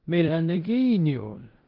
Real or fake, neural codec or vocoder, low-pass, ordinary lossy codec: fake; codec, 16 kHz in and 24 kHz out, 0.9 kbps, LongCat-Audio-Codec, four codebook decoder; 5.4 kHz; Opus, 16 kbps